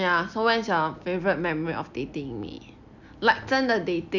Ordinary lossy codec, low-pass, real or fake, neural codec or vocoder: none; 7.2 kHz; real; none